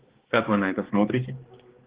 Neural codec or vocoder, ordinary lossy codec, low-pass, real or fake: codec, 16 kHz, 2 kbps, X-Codec, HuBERT features, trained on balanced general audio; Opus, 16 kbps; 3.6 kHz; fake